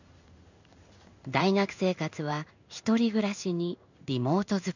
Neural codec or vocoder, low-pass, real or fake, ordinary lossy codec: codec, 16 kHz in and 24 kHz out, 1 kbps, XY-Tokenizer; 7.2 kHz; fake; none